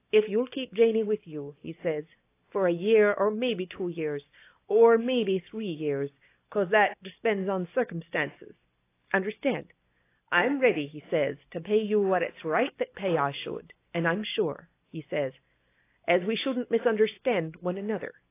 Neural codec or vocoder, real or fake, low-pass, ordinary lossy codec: none; real; 3.6 kHz; AAC, 24 kbps